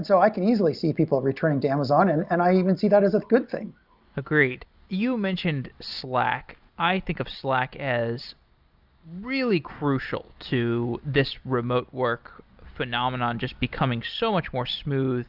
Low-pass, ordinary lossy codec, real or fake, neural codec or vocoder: 5.4 kHz; Opus, 64 kbps; real; none